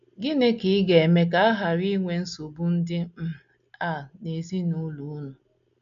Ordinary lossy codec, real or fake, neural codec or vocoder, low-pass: none; real; none; 7.2 kHz